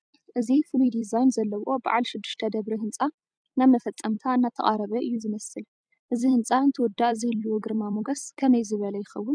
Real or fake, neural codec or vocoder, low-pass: fake; vocoder, 44.1 kHz, 128 mel bands every 256 samples, BigVGAN v2; 9.9 kHz